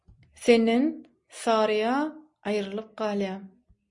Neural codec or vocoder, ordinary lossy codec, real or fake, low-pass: none; MP3, 48 kbps; real; 10.8 kHz